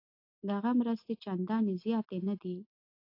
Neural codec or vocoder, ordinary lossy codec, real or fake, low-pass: none; AAC, 32 kbps; real; 5.4 kHz